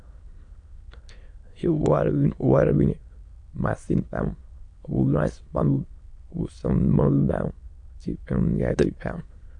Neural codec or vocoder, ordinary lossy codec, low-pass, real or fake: autoencoder, 22.05 kHz, a latent of 192 numbers a frame, VITS, trained on many speakers; AAC, 64 kbps; 9.9 kHz; fake